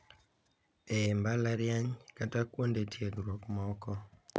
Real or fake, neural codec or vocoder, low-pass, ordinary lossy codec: real; none; none; none